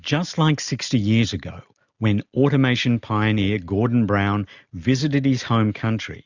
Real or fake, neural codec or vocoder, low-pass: real; none; 7.2 kHz